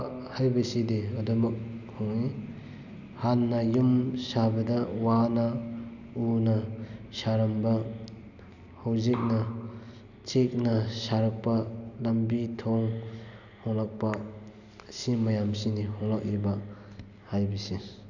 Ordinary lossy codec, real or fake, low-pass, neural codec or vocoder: none; real; 7.2 kHz; none